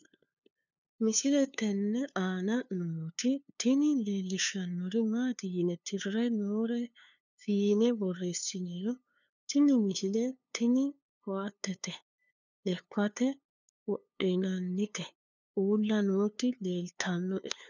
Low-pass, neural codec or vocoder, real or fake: 7.2 kHz; codec, 16 kHz, 2 kbps, FunCodec, trained on LibriTTS, 25 frames a second; fake